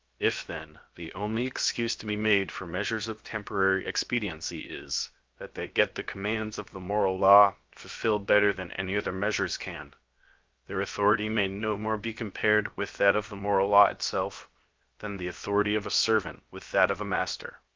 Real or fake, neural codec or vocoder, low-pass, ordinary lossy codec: fake; codec, 16 kHz, 0.7 kbps, FocalCodec; 7.2 kHz; Opus, 24 kbps